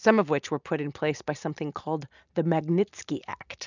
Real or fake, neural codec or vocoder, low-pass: real; none; 7.2 kHz